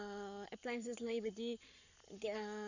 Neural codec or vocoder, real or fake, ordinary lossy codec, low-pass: codec, 44.1 kHz, 7.8 kbps, Pupu-Codec; fake; none; 7.2 kHz